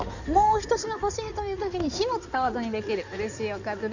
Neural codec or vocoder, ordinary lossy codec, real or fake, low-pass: codec, 16 kHz in and 24 kHz out, 2.2 kbps, FireRedTTS-2 codec; none; fake; 7.2 kHz